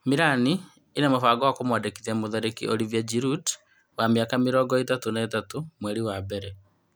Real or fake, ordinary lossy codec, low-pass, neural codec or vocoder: real; none; none; none